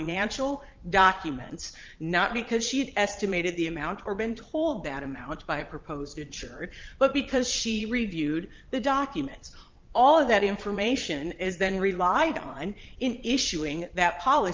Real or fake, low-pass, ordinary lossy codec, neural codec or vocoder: fake; 7.2 kHz; Opus, 24 kbps; vocoder, 22.05 kHz, 80 mel bands, Vocos